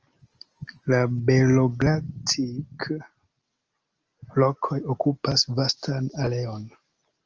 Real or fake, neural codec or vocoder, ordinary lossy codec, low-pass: real; none; Opus, 24 kbps; 7.2 kHz